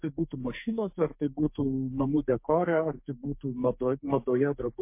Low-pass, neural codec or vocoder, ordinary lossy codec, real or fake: 3.6 kHz; codec, 44.1 kHz, 2.6 kbps, SNAC; MP3, 24 kbps; fake